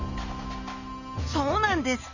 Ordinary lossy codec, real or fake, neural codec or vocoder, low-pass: none; real; none; 7.2 kHz